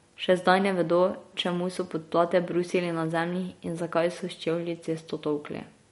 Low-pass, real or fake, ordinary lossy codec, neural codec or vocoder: 19.8 kHz; real; MP3, 48 kbps; none